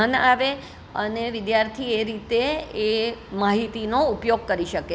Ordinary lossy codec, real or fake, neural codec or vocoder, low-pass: none; real; none; none